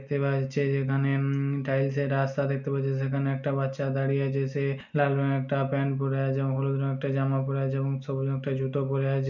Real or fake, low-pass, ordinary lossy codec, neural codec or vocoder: real; 7.2 kHz; none; none